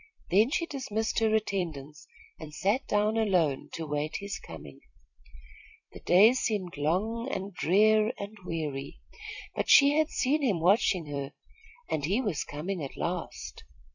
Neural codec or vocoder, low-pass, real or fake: vocoder, 44.1 kHz, 128 mel bands every 256 samples, BigVGAN v2; 7.2 kHz; fake